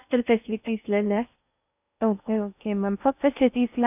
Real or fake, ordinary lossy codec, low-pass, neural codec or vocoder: fake; none; 3.6 kHz; codec, 16 kHz in and 24 kHz out, 0.6 kbps, FocalCodec, streaming, 4096 codes